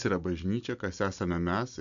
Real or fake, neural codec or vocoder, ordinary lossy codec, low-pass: real; none; MP3, 96 kbps; 7.2 kHz